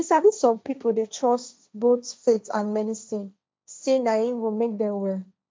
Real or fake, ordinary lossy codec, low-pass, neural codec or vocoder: fake; none; none; codec, 16 kHz, 1.1 kbps, Voila-Tokenizer